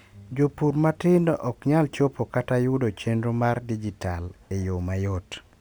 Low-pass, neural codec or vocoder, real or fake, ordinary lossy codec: none; none; real; none